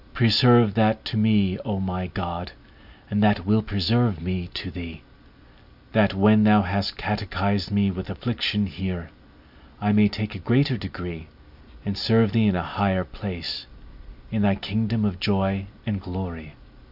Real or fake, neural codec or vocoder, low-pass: real; none; 5.4 kHz